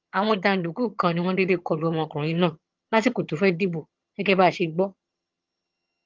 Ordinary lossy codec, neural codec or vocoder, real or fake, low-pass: Opus, 32 kbps; vocoder, 22.05 kHz, 80 mel bands, HiFi-GAN; fake; 7.2 kHz